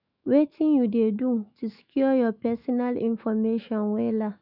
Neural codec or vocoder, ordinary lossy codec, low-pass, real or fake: autoencoder, 48 kHz, 128 numbers a frame, DAC-VAE, trained on Japanese speech; none; 5.4 kHz; fake